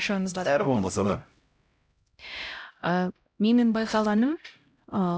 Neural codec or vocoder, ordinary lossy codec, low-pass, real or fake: codec, 16 kHz, 0.5 kbps, X-Codec, HuBERT features, trained on LibriSpeech; none; none; fake